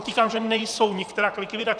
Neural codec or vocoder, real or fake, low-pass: vocoder, 44.1 kHz, 128 mel bands, Pupu-Vocoder; fake; 9.9 kHz